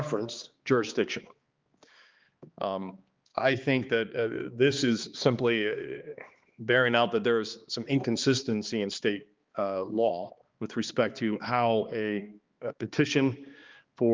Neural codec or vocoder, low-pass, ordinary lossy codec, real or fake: codec, 16 kHz, 2 kbps, X-Codec, HuBERT features, trained on balanced general audio; 7.2 kHz; Opus, 32 kbps; fake